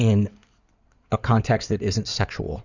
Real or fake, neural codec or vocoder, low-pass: fake; codec, 16 kHz in and 24 kHz out, 2.2 kbps, FireRedTTS-2 codec; 7.2 kHz